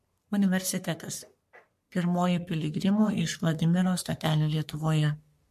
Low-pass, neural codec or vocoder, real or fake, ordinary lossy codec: 14.4 kHz; codec, 44.1 kHz, 3.4 kbps, Pupu-Codec; fake; MP3, 64 kbps